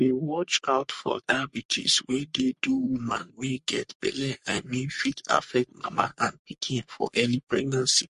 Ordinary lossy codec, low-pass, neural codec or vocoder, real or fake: MP3, 48 kbps; 14.4 kHz; codec, 44.1 kHz, 3.4 kbps, Pupu-Codec; fake